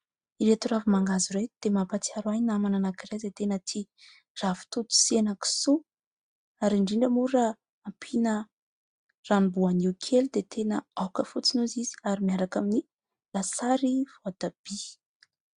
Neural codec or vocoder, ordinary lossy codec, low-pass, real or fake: none; Opus, 64 kbps; 9.9 kHz; real